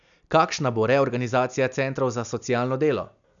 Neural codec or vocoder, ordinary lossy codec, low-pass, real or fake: none; none; 7.2 kHz; real